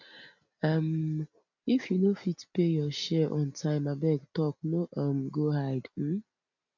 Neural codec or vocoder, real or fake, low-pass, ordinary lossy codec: none; real; 7.2 kHz; none